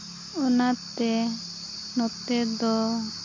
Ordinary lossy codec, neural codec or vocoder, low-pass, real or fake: MP3, 48 kbps; none; 7.2 kHz; real